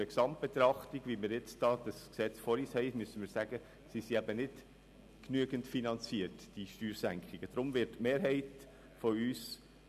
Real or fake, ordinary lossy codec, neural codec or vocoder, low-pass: real; none; none; 14.4 kHz